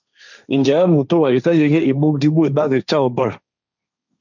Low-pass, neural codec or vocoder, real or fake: 7.2 kHz; codec, 16 kHz, 1.1 kbps, Voila-Tokenizer; fake